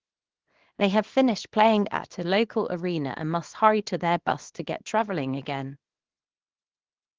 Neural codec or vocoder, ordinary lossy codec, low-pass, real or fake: codec, 24 kHz, 0.9 kbps, WavTokenizer, small release; Opus, 16 kbps; 7.2 kHz; fake